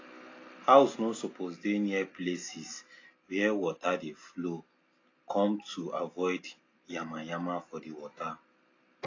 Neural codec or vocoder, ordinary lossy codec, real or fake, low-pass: none; AAC, 32 kbps; real; 7.2 kHz